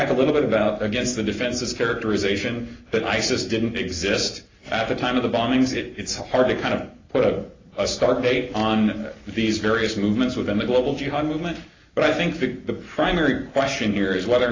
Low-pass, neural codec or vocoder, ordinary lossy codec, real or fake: 7.2 kHz; none; AAC, 32 kbps; real